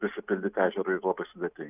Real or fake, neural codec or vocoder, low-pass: real; none; 3.6 kHz